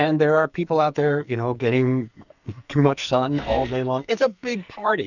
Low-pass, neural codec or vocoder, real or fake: 7.2 kHz; codec, 44.1 kHz, 2.6 kbps, SNAC; fake